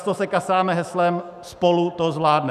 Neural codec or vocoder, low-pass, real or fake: autoencoder, 48 kHz, 128 numbers a frame, DAC-VAE, trained on Japanese speech; 14.4 kHz; fake